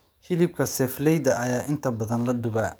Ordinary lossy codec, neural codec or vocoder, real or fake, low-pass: none; codec, 44.1 kHz, 7.8 kbps, DAC; fake; none